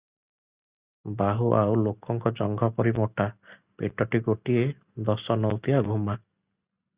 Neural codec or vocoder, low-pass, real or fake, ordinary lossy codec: none; 3.6 kHz; real; Opus, 64 kbps